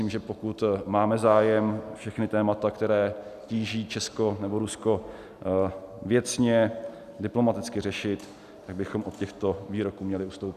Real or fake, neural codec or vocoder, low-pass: real; none; 14.4 kHz